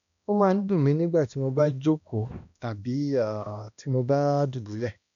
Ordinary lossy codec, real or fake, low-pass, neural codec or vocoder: none; fake; 7.2 kHz; codec, 16 kHz, 1 kbps, X-Codec, HuBERT features, trained on balanced general audio